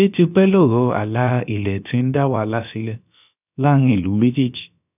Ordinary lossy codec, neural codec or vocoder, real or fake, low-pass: none; codec, 16 kHz, about 1 kbps, DyCAST, with the encoder's durations; fake; 3.6 kHz